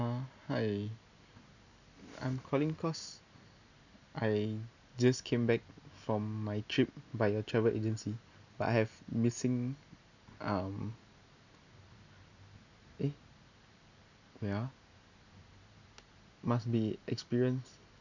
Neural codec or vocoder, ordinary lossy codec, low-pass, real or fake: none; none; 7.2 kHz; real